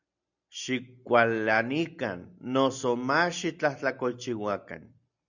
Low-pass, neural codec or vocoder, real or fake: 7.2 kHz; none; real